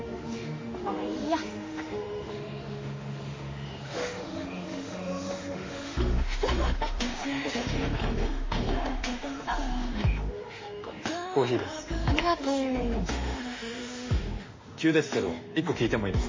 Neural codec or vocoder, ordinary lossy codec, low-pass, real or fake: autoencoder, 48 kHz, 32 numbers a frame, DAC-VAE, trained on Japanese speech; MP3, 32 kbps; 7.2 kHz; fake